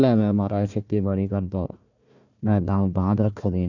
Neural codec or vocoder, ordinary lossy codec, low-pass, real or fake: codec, 16 kHz, 1 kbps, FunCodec, trained on Chinese and English, 50 frames a second; none; 7.2 kHz; fake